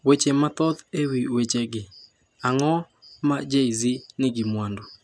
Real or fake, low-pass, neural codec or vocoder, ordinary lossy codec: real; none; none; none